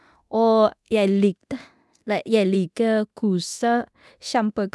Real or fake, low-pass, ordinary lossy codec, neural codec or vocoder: fake; none; none; codec, 24 kHz, 0.9 kbps, DualCodec